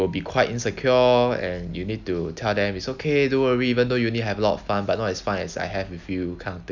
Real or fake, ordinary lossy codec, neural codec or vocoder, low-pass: real; none; none; 7.2 kHz